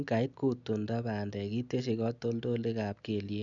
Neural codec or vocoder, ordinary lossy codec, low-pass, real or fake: none; none; 7.2 kHz; real